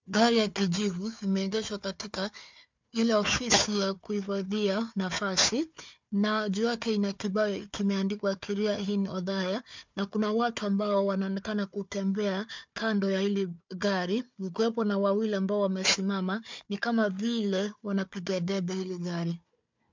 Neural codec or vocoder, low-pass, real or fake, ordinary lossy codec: codec, 16 kHz, 4 kbps, FunCodec, trained on Chinese and English, 50 frames a second; 7.2 kHz; fake; MP3, 64 kbps